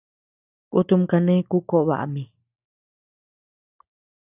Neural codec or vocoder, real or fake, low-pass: none; real; 3.6 kHz